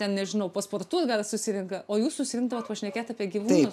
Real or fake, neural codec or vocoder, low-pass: real; none; 14.4 kHz